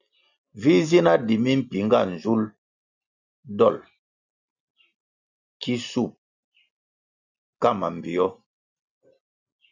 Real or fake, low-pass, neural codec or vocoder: real; 7.2 kHz; none